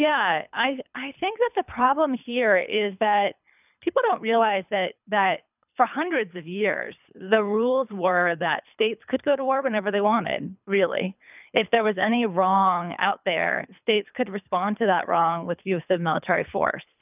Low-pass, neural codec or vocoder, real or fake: 3.6 kHz; codec, 24 kHz, 3 kbps, HILCodec; fake